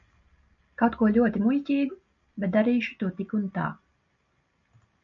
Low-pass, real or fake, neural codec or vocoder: 7.2 kHz; real; none